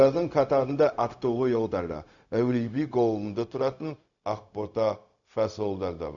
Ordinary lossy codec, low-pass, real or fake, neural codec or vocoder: none; 7.2 kHz; fake; codec, 16 kHz, 0.4 kbps, LongCat-Audio-Codec